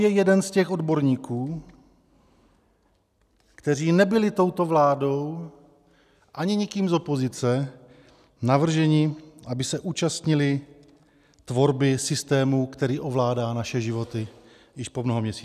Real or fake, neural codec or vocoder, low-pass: real; none; 14.4 kHz